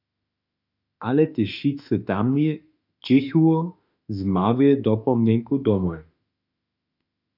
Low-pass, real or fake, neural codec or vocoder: 5.4 kHz; fake; autoencoder, 48 kHz, 32 numbers a frame, DAC-VAE, trained on Japanese speech